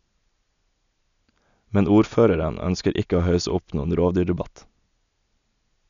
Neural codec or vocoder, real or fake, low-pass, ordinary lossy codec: none; real; 7.2 kHz; none